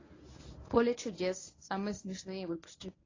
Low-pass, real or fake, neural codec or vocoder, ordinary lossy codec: 7.2 kHz; fake; codec, 24 kHz, 0.9 kbps, WavTokenizer, medium speech release version 1; AAC, 32 kbps